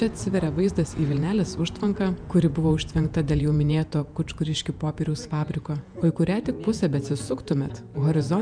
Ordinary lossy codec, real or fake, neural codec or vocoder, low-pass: MP3, 96 kbps; fake; vocoder, 48 kHz, 128 mel bands, Vocos; 9.9 kHz